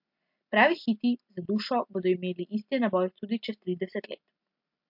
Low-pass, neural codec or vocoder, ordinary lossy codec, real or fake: 5.4 kHz; none; MP3, 48 kbps; real